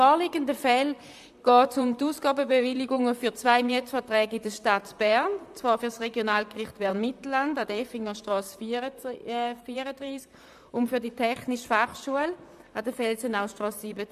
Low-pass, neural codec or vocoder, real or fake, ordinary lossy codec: 14.4 kHz; vocoder, 44.1 kHz, 128 mel bands, Pupu-Vocoder; fake; AAC, 96 kbps